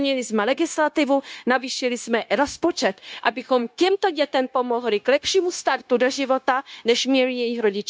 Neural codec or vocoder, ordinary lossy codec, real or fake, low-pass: codec, 16 kHz, 0.9 kbps, LongCat-Audio-Codec; none; fake; none